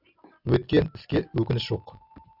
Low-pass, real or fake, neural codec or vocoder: 5.4 kHz; real; none